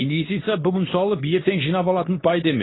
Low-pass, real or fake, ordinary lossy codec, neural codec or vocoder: 7.2 kHz; fake; AAC, 16 kbps; codec, 24 kHz, 0.9 kbps, WavTokenizer, medium speech release version 2